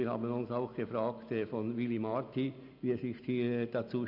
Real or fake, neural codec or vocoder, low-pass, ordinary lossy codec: real; none; 5.4 kHz; none